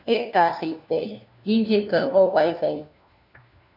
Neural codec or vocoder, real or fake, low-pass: codec, 16 kHz, 1 kbps, FunCodec, trained on LibriTTS, 50 frames a second; fake; 5.4 kHz